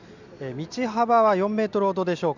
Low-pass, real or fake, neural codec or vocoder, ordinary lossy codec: 7.2 kHz; real; none; none